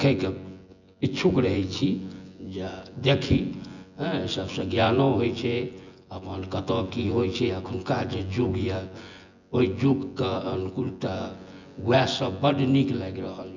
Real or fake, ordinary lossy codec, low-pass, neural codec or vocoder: fake; none; 7.2 kHz; vocoder, 24 kHz, 100 mel bands, Vocos